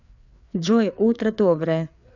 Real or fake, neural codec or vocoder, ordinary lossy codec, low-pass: fake; codec, 16 kHz, 4 kbps, FreqCodec, larger model; none; 7.2 kHz